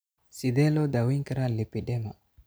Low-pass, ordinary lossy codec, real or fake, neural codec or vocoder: none; none; real; none